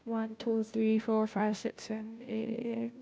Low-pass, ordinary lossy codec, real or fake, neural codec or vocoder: none; none; fake; codec, 16 kHz, 0.5 kbps, FunCodec, trained on Chinese and English, 25 frames a second